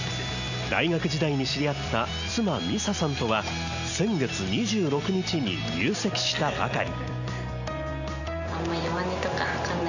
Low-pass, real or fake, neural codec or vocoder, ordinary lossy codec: 7.2 kHz; real; none; none